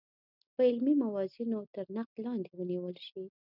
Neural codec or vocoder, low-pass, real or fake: none; 5.4 kHz; real